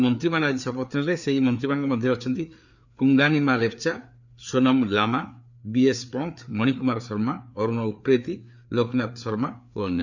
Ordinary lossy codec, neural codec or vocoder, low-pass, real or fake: none; codec, 16 kHz, 4 kbps, FreqCodec, larger model; 7.2 kHz; fake